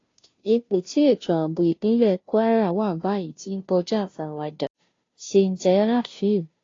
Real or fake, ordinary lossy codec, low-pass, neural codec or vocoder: fake; AAC, 32 kbps; 7.2 kHz; codec, 16 kHz, 0.5 kbps, FunCodec, trained on Chinese and English, 25 frames a second